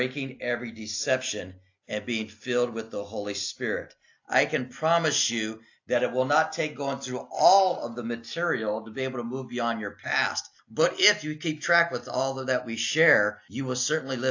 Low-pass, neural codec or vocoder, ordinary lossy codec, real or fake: 7.2 kHz; none; AAC, 48 kbps; real